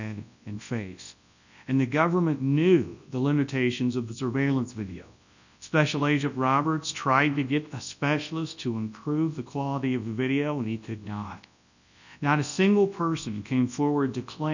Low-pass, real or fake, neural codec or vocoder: 7.2 kHz; fake; codec, 24 kHz, 0.9 kbps, WavTokenizer, large speech release